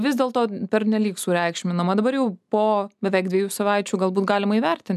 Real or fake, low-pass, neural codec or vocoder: real; 14.4 kHz; none